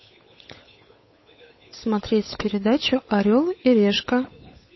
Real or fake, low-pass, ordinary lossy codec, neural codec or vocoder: fake; 7.2 kHz; MP3, 24 kbps; codec, 16 kHz, 8 kbps, FunCodec, trained on Chinese and English, 25 frames a second